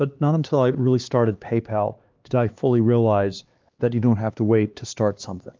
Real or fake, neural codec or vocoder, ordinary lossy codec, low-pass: fake; codec, 16 kHz, 2 kbps, X-Codec, HuBERT features, trained on LibriSpeech; Opus, 32 kbps; 7.2 kHz